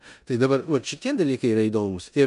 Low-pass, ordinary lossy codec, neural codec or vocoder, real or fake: 10.8 kHz; MP3, 96 kbps; codec, 16 kHz in and 24 kHz out, 0.9 kbps, LongCat-Audio-Codec, four codebook decoder; fake